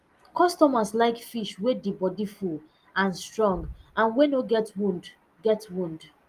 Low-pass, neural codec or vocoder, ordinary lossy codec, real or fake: 14.4 kHz; none; Opus, 32 kbps; real